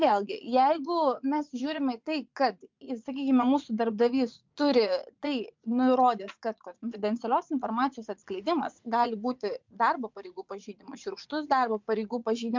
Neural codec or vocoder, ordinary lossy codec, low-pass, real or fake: vocoder, 44.1 kHz, 80 mel bands, Vocos; MP3, 64 kbps; 7.2 kHz; fake